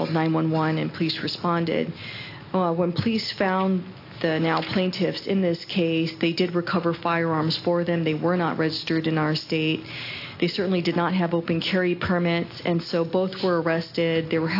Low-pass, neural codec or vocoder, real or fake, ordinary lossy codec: 5.4 kHz; none; real; AAC, 32 kbps